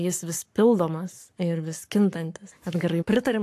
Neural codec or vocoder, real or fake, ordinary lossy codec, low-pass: codec, 44.1 kHz, 7.8 kbps, Pupu-Codec; fake; AAC, 64 kbps; 14.4 kHz